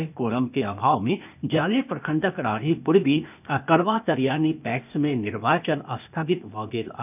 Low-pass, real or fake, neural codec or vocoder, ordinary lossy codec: 3.6 kHz; fake; codec, 16 kHz, 0.8 kbps, ZipCodec; none